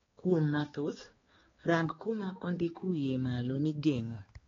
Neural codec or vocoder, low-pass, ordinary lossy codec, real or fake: codec, 16 kHz, 2 kbps, X-Codec, HuBERT features, trained on balanced general audio; 7.2 kHz; AAC, 24 kbps; fake